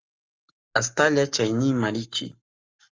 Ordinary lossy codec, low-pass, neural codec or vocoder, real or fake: Opus, 32 kbps; 7.2 kHz; codec, 44.1 kHz, 7.8 kbps, Pupu-Codec; fake